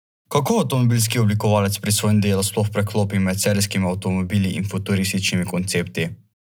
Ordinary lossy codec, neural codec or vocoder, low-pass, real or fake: none; none; none; real